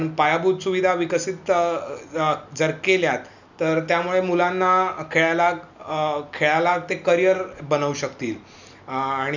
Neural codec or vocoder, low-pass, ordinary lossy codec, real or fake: none; 7.2 kHz; none; real